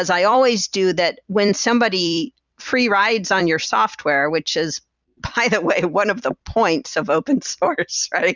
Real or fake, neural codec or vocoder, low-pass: fake; vocoder, 44.1 kHz, 128 mel bands every 256 samples, BigVGAN v2; 7.2 kHz